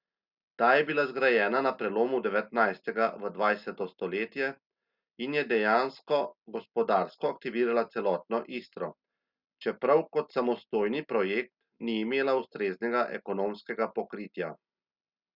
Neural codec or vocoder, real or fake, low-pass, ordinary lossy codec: none; real; 5.4 kHz; Opus, 64 kbps